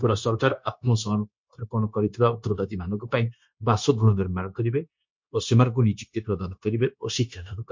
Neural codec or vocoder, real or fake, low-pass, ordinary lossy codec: codec, 16 kHz, 0.9 kbps, LongCat-Audio-Codec; fake; 7.2 kHz; MP3, 48 kbps